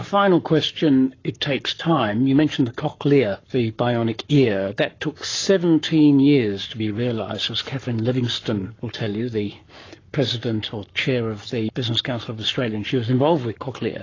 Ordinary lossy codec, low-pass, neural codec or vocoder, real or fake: AAC, 32 kbps; 7.2 kHz; codec, 44.1 kHz, 7.8 kbps, Pupu-Codec; fake